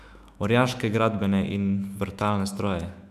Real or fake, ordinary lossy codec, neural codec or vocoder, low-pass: fake; none; autoencoder, 48 kHz, 128 numbers a frame, DAC-VAE, trained on Japanese speech; 14.4 kHz